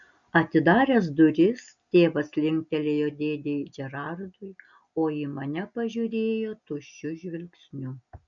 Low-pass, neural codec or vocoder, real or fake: 7.2 kHz; none; real